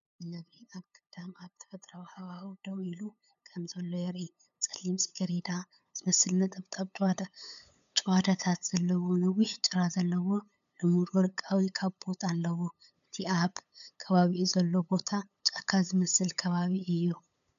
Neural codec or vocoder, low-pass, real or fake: codec, 16 kHz, 8 kbps, FunCodec, trained on LibriTTS, 25 frames a second; 7.2 kHz; fake